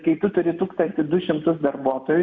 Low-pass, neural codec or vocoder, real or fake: 7.2 kHz; none; real